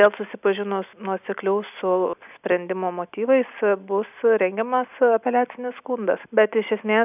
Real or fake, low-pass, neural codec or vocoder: real; 3.6 kHz; none